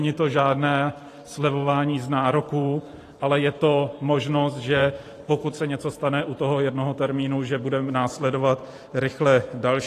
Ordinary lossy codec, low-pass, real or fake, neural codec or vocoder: AAC, 48 kbps; 14.4 kHz; fake; vocoder, 44.1 kHz, 128 mel bands every 512 samples, BigVGAN v2